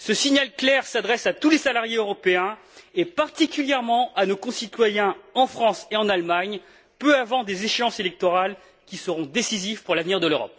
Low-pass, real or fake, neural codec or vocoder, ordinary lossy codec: none; real; none; none